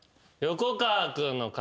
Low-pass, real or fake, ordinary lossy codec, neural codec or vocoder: none; real; none; none